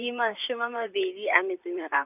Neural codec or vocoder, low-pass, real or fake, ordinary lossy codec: vocoder, 44.1 kHz, 128 mel bands, Pupu-Vocoder; 3.6 kHz; fake; none